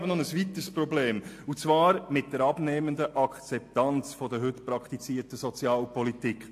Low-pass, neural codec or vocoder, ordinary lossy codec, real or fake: 14.4 kHz; none; AAC, 64 kbps; real